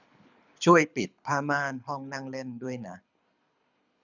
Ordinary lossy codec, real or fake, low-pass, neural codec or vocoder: none; fake; 7.2 kHz; codec, 24 kHz, 6 kbps, HILCodec